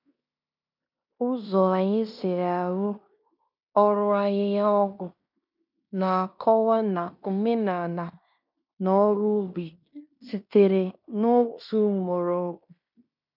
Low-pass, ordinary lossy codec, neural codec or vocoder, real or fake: 5.4 kHz; none; codec, 16 kHz in and 24 kHz out, 0.9 kbps, LongCat-Audio-Codec, fine tuned four codebook decoder; fake